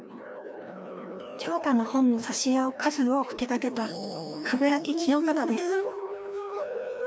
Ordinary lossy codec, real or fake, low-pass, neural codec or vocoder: none; fake; none; codec, 16 kHz, 1 kbps, FreqCodec, larger model